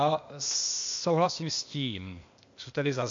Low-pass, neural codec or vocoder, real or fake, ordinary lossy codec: 7.2 kHz; codec, 16 kHz, 0.8 kbps, ZipCodec; fake; MP3, 48 kbps